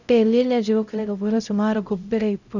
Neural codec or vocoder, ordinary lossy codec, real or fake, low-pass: codec, 16 kHz, 0.5 kbps, X-Codec, HuBERT features, trained on LibriSpeech; none; fake; 7.2 kHz